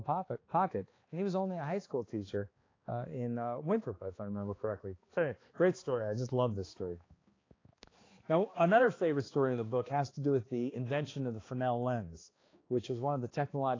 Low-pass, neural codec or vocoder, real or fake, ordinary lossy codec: 7.2 kHz; codec, 16 kHz, 2 kbps, X-Codec, HuBERT features, trained on balanced general audio; fake; AAC, 32 kbps